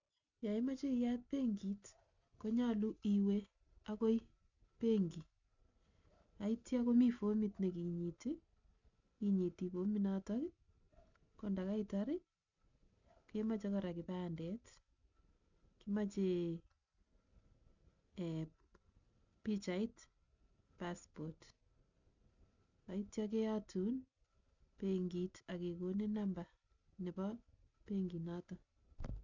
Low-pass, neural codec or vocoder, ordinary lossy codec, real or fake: 7.2 kHz; none; none; real